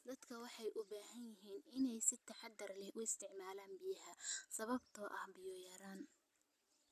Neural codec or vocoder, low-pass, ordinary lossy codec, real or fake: none; 14.4 kHz; none; real